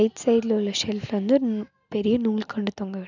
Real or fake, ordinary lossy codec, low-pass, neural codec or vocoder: real; none; 7.2 kHz; none